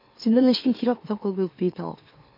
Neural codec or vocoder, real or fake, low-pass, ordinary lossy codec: autoencoder, 44.1 kHz, a latent of 192 numbers a frame, MeloTTS; fake; 5.4 kHz; MP3, 32 kbps